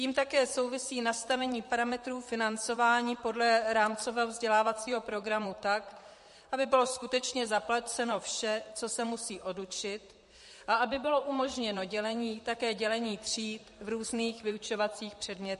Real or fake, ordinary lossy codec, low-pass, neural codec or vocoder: fake; MP3, 48 kbps; 14.4 kHz; vocoder, 44.1 kHz, 128 mel bands, Pupu-Vocoder